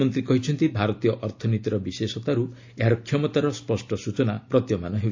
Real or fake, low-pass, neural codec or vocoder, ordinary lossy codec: real; 7.2 kHz; none; AAC, 48 kbps